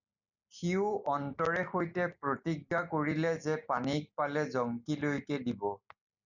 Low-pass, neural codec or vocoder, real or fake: 7.2 kHz; none; real